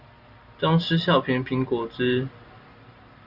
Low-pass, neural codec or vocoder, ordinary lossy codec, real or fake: 5.4 kHz; none; Opus, 64 kbps; real